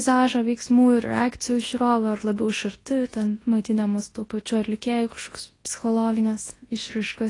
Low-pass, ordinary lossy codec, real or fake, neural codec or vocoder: 10.8 kHz; AAC, 32 kbps; fake; codec, 24 kHz, 0.9 kbps, WavTokenizer, large speech release